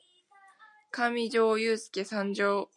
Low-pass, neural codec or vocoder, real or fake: 10.8 kHz; none; real